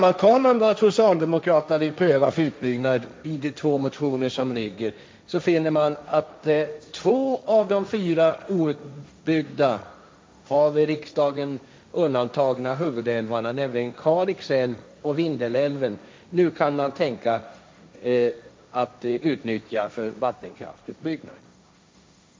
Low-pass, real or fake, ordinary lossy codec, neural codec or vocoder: none; fake; none; codec, 16 kHz, 1.1 kbps, Voila-Tokenizer